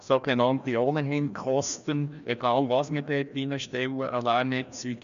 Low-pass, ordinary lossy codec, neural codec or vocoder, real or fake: 7.2 kHz; none; codec, 16 kHz, 1 kbps, FreqCodec, larger model; fake